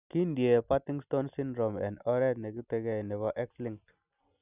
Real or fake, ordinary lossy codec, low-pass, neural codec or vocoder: real; none; 3.6 kHz; none